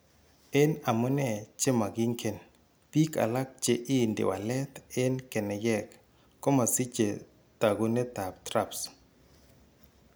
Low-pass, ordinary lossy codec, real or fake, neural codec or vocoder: none; none; real; none